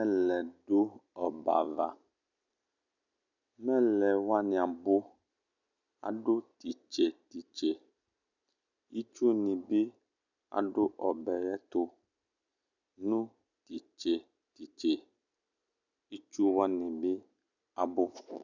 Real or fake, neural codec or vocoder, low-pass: real; none; 7.2 kHz